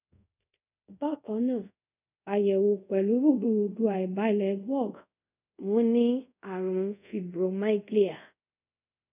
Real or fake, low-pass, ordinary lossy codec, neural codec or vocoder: fake; 3.6 kHz; none; codec, 24 kHz, 0.5 kbps, DualCodec